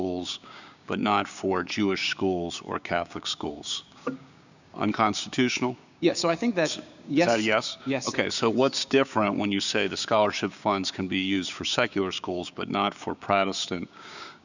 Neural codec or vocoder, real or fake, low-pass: codec, 16 kHz, 6 kbps, DAC; fake; 7.2 kHz